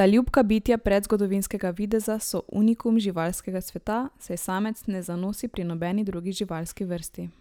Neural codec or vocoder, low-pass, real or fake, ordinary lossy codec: none; none; real; none